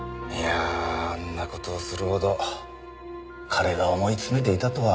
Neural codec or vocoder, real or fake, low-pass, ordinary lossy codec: none; real; none; none